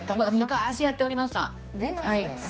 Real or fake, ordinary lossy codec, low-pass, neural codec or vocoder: fake; none; none; codec, 16 kHz, 2 kbps, X-Codec, HuBERT features, trained on general audio